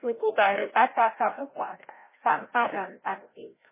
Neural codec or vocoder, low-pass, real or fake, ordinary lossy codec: codec, 16 kHz, 0.5 kbps, FreqCodec, larger model; 3.6 kHz; fake; MP3, 16 kbps